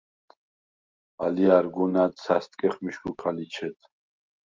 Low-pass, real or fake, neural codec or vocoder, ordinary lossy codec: 7.2 kHz; real; none; Opus, 32 kbps